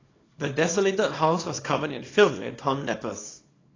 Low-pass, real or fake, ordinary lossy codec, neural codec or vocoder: 7.2 kHz; fake; AAC, 32 kbps; codec, 24 kHz, 0.9 kbps, WavTokenizer, small release